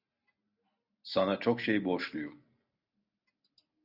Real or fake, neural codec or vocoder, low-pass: real; none; 5.4 kHz